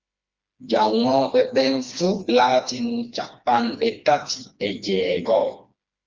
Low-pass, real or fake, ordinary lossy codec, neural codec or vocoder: 7.2 kHz; fake; Opus, 32 kbps; codec, 16 kHz, 2 kbps, FreqCodec, smaller model